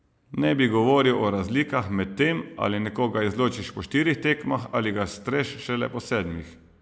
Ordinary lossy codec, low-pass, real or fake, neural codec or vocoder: none; none; real; none